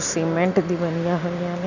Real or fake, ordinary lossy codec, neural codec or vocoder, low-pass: real; none; none; 7.2 kHz